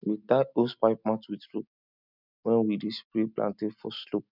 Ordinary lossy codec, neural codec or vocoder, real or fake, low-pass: none; none; real; 5.4 kHz